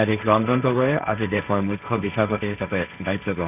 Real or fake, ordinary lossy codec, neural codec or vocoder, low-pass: fake; none; codec, 16 kHz, 1.1 kbps, Voila-Tokenizer; 3.6 kHz